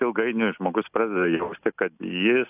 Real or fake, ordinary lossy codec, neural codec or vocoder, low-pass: real; AAC, 32 kbps; none; 3.6 kHz